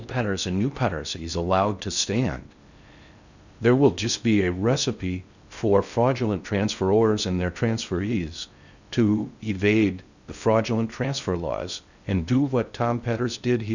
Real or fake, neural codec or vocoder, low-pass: fake; codec, 16 kHz in and 24 kHz out, 0.6 kbps, FocalCodec, streaming, 4096 codes; 7.2 kHz